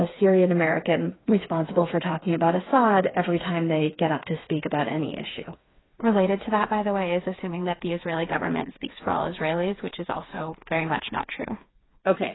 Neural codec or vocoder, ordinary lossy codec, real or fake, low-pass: codec, 16 kHz, 4 kbps, FreqCodec, smaller model; AAC, 16 kbps; fake; 7.2 kHz